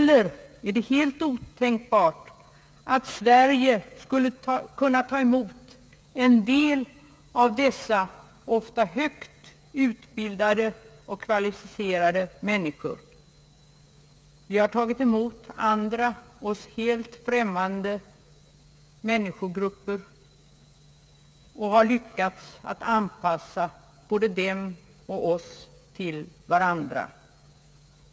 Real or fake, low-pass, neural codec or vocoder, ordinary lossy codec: fake; none; codec, 16 kHz, 8 kbps, FreqCodec, smaller model; none